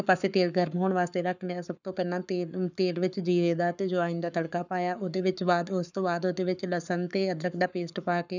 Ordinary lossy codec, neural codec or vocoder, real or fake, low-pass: none; codec, 44.1 kHz, 7.8 kbps, Pupu-Codec; fake; 7.2 kHz